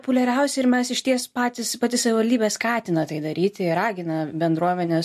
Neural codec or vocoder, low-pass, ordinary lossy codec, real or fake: none; 14.4 kHz; MP3, 64 kbps; real